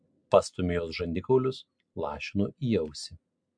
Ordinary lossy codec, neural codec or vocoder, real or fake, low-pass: MP3, 64 kbps; none; real; 9.9 kHz